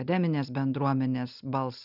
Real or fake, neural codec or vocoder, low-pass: real; none; 5.4 kHz